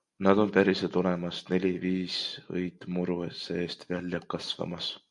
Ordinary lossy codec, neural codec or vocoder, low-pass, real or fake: MP3, 64 kbps; none; 10.8 kHz; real